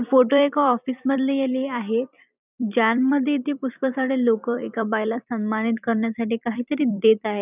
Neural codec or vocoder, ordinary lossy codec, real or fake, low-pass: vocoder, 44.1 kHz, 128 mel bands every 256 samples, BigVGAN v2; none; fake; 3.6 kHz